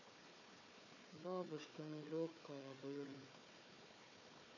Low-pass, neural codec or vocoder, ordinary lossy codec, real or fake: 7.2 kHz; codec, 16 kHz, 4 kbps, FunCodec, trained on Chinese and English, 50 frames a second; none; fake